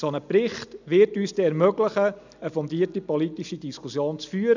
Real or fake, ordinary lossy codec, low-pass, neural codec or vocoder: real; none; 7.2 kHz; none